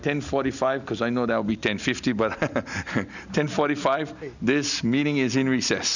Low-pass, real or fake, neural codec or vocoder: 7.2 kHz; real; none